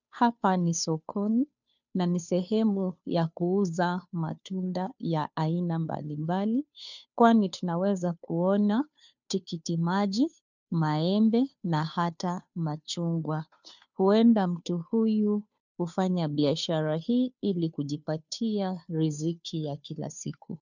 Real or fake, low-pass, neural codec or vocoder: fake; 7.2 kHz; codec, 16 kHz, 2 kbps, FunCodec, trained on Chinese and English, 25 frames a second